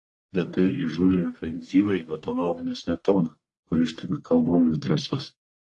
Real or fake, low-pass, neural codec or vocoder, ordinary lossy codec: fake; 10.8 kHz; codec, 44.1 kHz, 1.7 kbps, Pupu-Codec; AAC, 48 kbps